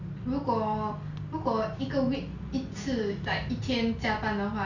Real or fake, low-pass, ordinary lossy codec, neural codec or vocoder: real; 7.2 kHz; none; none